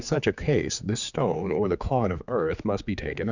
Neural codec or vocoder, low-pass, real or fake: codec, 16 kHz, 4 kbps, X-Codec, HuBERT features, trained on general audio; 7.2 kHz; fake